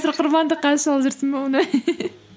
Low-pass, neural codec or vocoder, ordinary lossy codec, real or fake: none; none; none; real